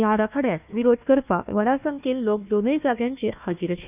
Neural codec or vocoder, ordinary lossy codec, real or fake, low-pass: codec, 16 kHz, 1 kbps, FunCodec, trained on Chinese and English, 50 frames a second; none; fake; 3.6 kHz